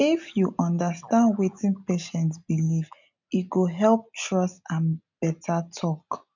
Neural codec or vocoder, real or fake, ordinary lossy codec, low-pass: none; real; none; 7.2 kHz